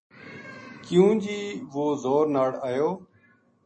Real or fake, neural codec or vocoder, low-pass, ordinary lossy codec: real; none; 10.8 kHz; MP3, 32 kbps